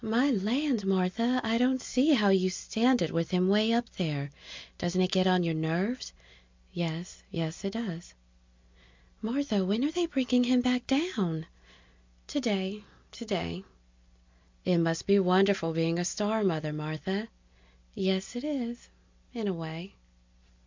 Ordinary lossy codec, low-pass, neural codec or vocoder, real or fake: MP3, 64 kbps; 7.2 kHz; none; real